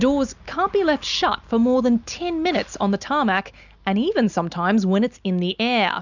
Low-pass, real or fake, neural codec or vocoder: 7.2 kHz; real; none